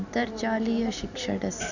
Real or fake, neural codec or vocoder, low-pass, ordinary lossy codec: real; none; 7.2 kHz; none